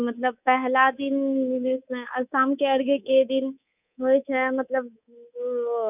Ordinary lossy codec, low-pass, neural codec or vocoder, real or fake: none; 3.6 kHz; codec, 24 kHz, 3.1 kbps, DualCodec; fake